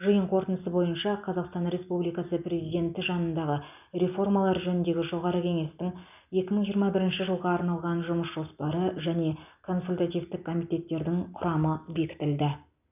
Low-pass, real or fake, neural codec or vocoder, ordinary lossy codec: 3.6 kHz; real; none; none